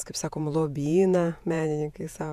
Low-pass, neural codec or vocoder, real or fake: 14.4 kHz; vocoder, 48 kHz, 128 mel bands, Vocos; fake